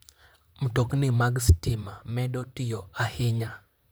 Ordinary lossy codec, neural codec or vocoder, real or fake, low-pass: none; vocoder, 44.1 kHz, 128 mel bands, Pupu-Vocoder; fake; none